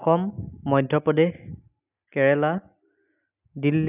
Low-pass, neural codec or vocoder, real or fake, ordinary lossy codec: 3.6 kHz; none; real; none